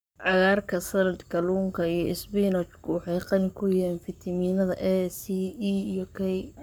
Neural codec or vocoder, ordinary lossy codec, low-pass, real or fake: codec, 44.1 kHz, 7.8 kbps, Pupu-Codec; none; none; fake